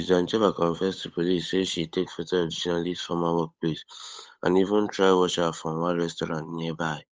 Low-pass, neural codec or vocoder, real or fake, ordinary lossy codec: none; codec, 16 kHz, 8 kbps, FunCodec, trained on Chinese and English, 25 frames a second; fake; none